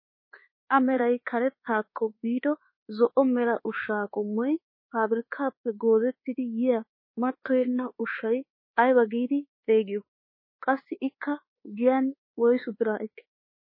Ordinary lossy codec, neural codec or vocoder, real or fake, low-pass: MP3, 24 kbps; codec, 24 kHz, 1.2 kbps, DualCodec; fake; 5.4 kHz